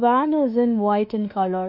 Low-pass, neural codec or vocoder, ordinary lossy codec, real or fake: 5.4 kHz; autoencoder, 48 kHz, 32 numbers a frame, DAC-VAE, trained on Japanese speech; none; fake